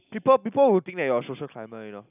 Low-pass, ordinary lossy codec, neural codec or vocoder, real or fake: 3.6 kHz; none; none; real